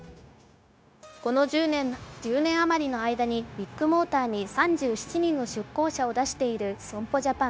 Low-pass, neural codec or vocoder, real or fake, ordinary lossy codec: none; codec, 16 kHz, 0.9 kbps, LongCat-Audio-Codec; fake; none